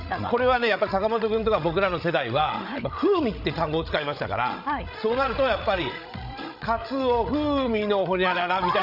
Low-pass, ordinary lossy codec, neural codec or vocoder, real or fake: 5.4 kHz; none; codec, 16 kHz, 16 kbps, FreqCodec, larger model; fake